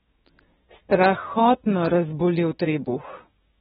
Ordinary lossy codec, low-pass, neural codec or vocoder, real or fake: AAC, 16 kbps; 7.2 kHz; codec, 16 kHz, 6 kbps, DAC; fake